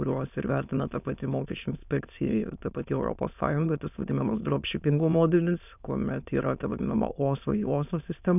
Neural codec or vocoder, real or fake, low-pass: autoencoder, 22.05 kHz, a latent of 192 numbers a frame, VITS, trained on many speakers; fake; 3.6 kHz